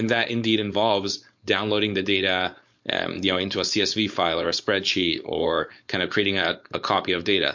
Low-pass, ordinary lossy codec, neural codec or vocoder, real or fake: 7.2 kHz; MP3, 48 kbps; codec, 16 kHz, 4.8 kbps, FACodec; fake